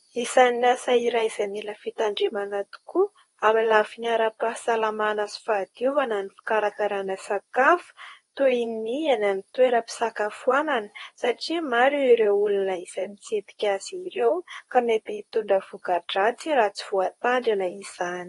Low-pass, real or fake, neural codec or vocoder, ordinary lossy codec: 10.8 kHz; fake; codec, 24 kHz, 0.9 kbps, WavTokenizer, medium speech release version 2; AAC, 32 kbps